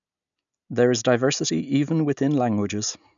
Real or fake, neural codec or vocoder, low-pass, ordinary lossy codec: real; none; 7.2 kHz; none